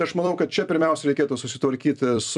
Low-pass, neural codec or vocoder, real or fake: 10.8 kHz; vocoder, 44.1 kHz, 128 mel bands every 256 samples, BigVGAN v2; fake